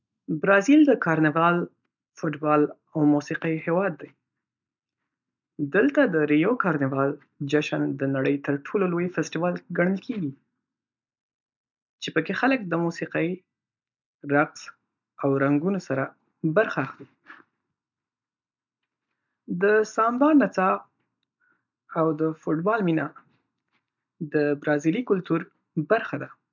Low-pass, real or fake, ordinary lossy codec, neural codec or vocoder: 7.2 kHz; real; none; none